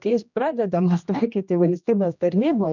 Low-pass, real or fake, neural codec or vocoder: 7.2 kHz; fake; codec, 16 kHz, 1 kbps, X-Codec, HuBERT features, trained on general audio